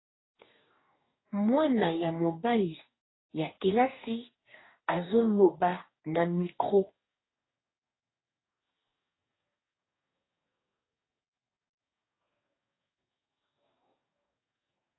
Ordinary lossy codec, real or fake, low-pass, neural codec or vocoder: AAC, 16 kbps; fake; 7.2 kHz; codec, 44.1 kHz, 2.6 kbps, DAC